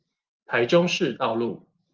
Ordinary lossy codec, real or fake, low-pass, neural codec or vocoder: Opus, 32 kbps; real; 7.2 kHz; none